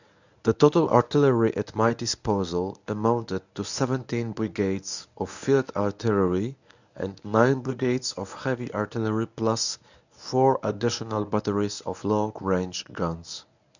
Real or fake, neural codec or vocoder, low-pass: fake; codec, 24 kHz, 0.9 kbps, WavTokenizer, medium speech release version 1; 7.2 kHz